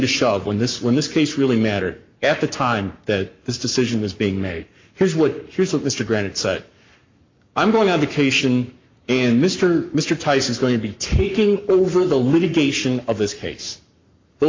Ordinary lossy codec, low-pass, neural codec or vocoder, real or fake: MP3, 48 kbps; 7.2 kHz; codec, 44.1 kHz, 7.8 kbps, Pupu-Codec; fake